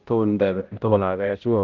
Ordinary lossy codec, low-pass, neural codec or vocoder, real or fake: Opus, 16 kbps; 7.2 kHz; codec, 16 kHz, 0.5 kbps, X-Codec, HuBERT features, trained on balanced general audio; fake